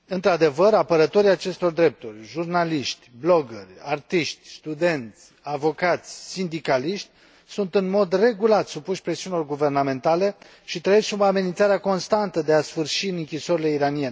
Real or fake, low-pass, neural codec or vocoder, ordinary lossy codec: real; none; none; none